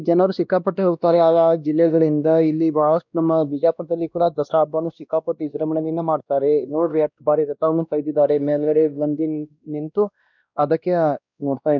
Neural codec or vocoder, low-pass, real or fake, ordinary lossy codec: codec, 16 kHz, 1 kbps, X-Codec, WavLM features, trained on Multilingual LibriSpeech; 7.2 kHz; fake; none